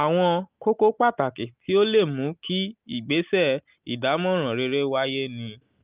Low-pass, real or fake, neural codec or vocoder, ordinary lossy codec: 3.6 kHz; real; none; Opus, 24 kbps